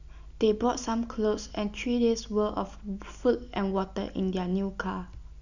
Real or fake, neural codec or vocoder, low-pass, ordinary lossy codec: real; none; 7.2 kHz; none